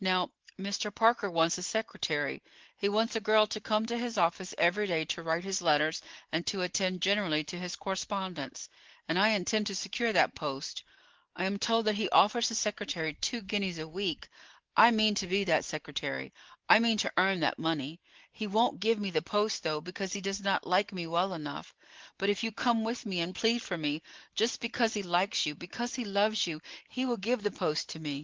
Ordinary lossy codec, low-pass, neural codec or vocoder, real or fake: Opus, 16 kbps; 7.2 kHz; none; real